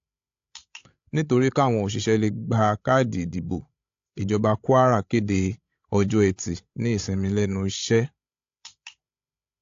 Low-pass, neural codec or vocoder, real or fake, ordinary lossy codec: 7.2 kHz; codec, 16 kHz, 16 kbps, FreqCodec, larger model; fake; AAC, 48 kbps